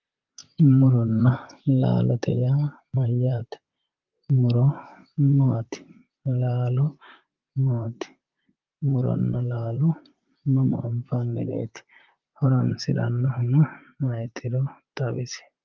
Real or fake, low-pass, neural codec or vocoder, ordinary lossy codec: fake; 7.2 kHz; vocoder, 44.1 kHz, 128 mel bands, Pupu-Vocoder; Opus, 24 kbps